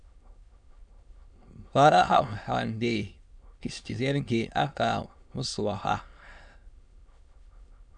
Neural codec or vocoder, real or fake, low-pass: autoencoder, 22.05 kHz, a latent of 192 numbers a frame, VITS, trained on many speakers; fake; 9.9 kHz